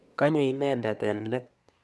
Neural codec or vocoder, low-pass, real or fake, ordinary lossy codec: codec, 24 kHz, 1 kbps, SNAC; none; fake; none